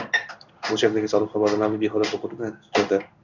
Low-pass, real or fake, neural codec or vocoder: 7.2 kHz; fake; codec, 16 kHz in and 24 kHz out, 1 kbps, XY-Tokenizer